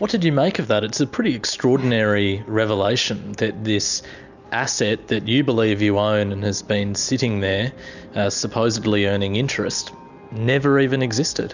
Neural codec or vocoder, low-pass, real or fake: none; 7.2 kHz; real